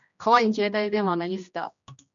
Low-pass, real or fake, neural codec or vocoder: 7.2 kHz; fake; codec, 16 kHz, 1 kbps, X-Codec, HuBERT features, trained on general audio